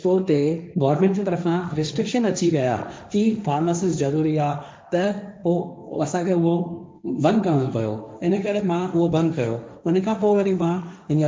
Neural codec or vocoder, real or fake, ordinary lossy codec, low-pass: codec, 16 kHz, 1.1 kbps, Voila-Tokenizer; fake; none; none